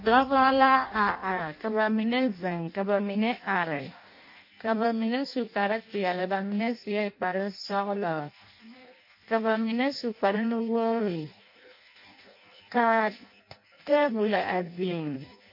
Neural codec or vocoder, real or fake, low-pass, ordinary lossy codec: codec, 16 kHz in and 24 kHz out, 0.6 kbps, FireRedTTS-2 codec; fake; 5.4 kHz; MP3, 32 kbps